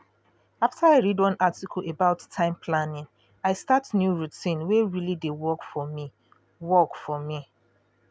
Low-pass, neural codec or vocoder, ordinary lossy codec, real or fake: none; none; none; real